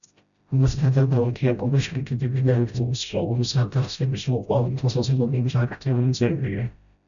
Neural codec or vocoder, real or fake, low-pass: codec, 16 kHz, 0.5 kbps, FreqCodec, smaller model; fake; 7.2 kHz